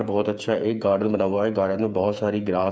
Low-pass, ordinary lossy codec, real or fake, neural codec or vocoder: none; none; fake; codec, 16 kHz, 8 kbps, FreqCodec, smaller model